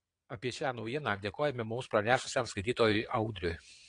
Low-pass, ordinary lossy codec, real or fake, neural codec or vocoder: 9.9 kHz; AAC, 48 kbps; fake; vocoder, 22.05 kHz, 80 mel bands, Vocos